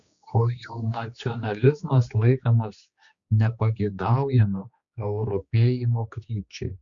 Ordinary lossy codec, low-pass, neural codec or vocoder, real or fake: Opus, 64 kbps; 7.2 kHz; codec, 16 kHz, 2 kbps, X-Codec, HuBERT features, trained on general audio; fake